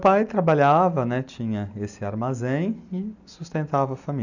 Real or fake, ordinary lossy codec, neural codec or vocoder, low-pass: real; none; none; 7.2 kHz